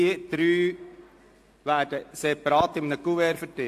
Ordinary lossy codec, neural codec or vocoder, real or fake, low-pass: AAC, 64 kbps; none; real; 14.4 kHz